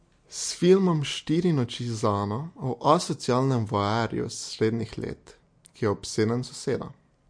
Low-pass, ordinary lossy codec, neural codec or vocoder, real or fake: 9.9 kHz; MP3, 48 kbps; none; real